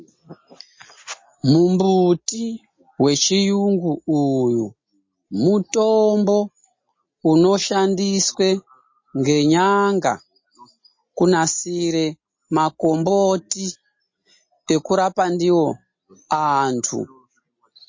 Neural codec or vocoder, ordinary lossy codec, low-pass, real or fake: none; MP3, 32 kbps; 7.2 kHz; real